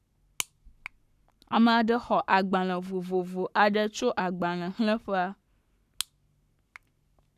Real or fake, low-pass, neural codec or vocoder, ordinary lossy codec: fake; 14.4 kHz; codec, 44.1 kHz, 7.8 kbps, Pupu-Codec; none